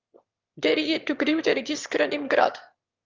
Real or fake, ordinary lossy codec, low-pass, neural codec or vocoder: fake; Opus, 24 kbps; 7.2 kHz; autoencoder, 22.05 kHz, a latent of 192 numbers a frame, VITS, trained on one speaker